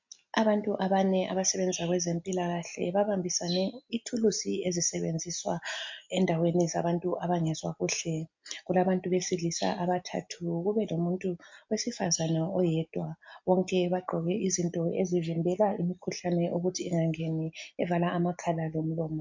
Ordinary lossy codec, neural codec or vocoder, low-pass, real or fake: MP3, 48 kbps; none; 7.2 kHz; real